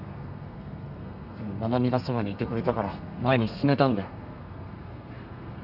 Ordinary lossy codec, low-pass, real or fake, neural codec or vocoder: none; 5.4 kHz; fake; codec, 32 kHz, 1.9 kbps, SNAC